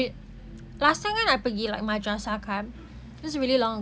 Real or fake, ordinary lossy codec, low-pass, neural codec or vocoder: real; none; none; none